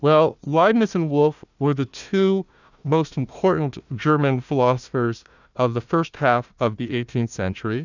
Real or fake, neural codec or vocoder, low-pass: fake; codec, 16 kHz, 1 kbps, FunCodec, trained on Chinese and English, 50 frames a second; 7.2 kHz